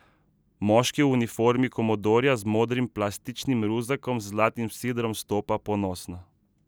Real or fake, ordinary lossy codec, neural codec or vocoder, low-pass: real; none; none; none